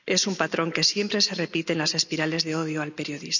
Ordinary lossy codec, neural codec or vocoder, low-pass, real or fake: none; none; 7.2 kHz; real